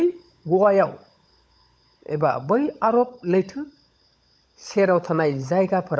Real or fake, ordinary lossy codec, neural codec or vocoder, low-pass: fake; none; codec, 16 kHz, 16 kbps, FunCodec, trained on LibriTTS, 50 frames a second; none